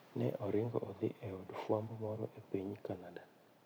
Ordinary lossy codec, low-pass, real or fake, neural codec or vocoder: none; none; fake; vocoder, 44.1 kHz, 128 mel bands every 512 samples, BigVGAN v2